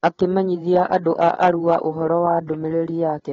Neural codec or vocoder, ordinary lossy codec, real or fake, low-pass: codec, 16 kHz, 8 kbps, FreqCodec, larger model; AAC, 24 kbps; fake; 7.2 kHz